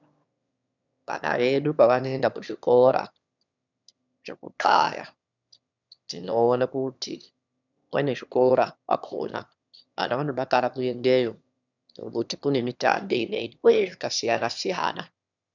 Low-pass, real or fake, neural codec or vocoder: 7.2 kHz; fake; autoencoder, 22.05 kHz, a latent of 192 numbers a frame, VITS, trained on one speaker